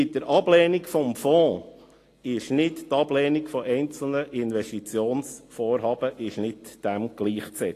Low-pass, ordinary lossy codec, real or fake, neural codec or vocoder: 14.4 kHz; AAC, 48 kbps; real; none